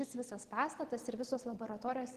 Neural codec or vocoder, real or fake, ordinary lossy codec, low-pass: none; real; Opus, 16 kbps; 14.4 kHz